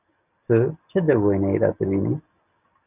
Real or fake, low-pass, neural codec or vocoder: real; 3.6 kHz; none